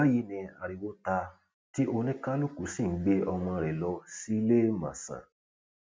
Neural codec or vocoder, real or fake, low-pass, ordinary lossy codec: none; real; none; none